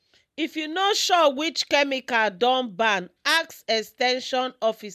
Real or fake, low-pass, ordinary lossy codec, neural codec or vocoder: real; 14.4 kHz; MP3, 96 kbps; none